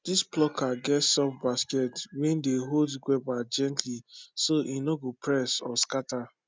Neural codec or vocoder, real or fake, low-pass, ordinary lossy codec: none; real; none; none